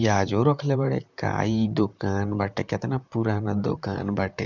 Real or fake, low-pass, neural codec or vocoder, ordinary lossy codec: fake; 7.2 kHz; vocoder, 44.1 kHz, 128 mel bands, Pupu-Vocoder; none